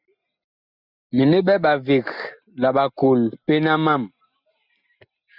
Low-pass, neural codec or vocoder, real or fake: 5.4 kHz; none; real